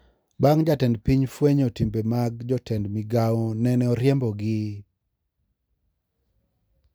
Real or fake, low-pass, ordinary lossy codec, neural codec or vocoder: real; none; none; none